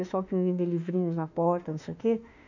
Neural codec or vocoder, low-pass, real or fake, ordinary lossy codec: autoencoder, 48 kHz, 32 numbers a frame, DAC-VAE, trained on Japanese speech; 7.2 kHz; fake; none